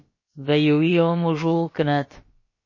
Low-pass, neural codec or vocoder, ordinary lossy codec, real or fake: 7.2 kHz; codec, 16 kHz, about 1 kbps, DyCAST, with the encoder's durations; MP3, 32 kbps; fake